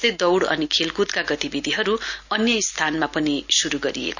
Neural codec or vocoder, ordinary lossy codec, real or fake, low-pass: none; none; real; 7.2 kHz